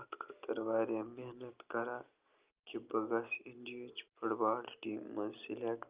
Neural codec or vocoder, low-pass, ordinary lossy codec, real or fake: none; 3.6 kHz; Opus, 24 kbps; real